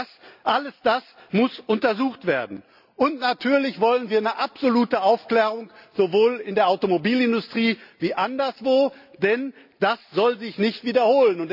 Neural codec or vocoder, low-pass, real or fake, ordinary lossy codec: none; 5.4 kHz; real; none